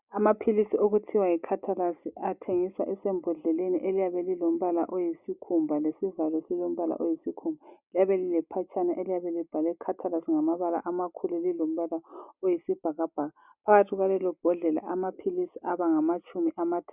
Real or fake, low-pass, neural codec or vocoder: real; 3.6 kHz; none